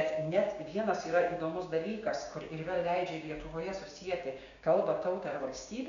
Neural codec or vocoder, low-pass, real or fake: codec, 16 kHz, 6 kbps, DAC; 7.2 kHz; fake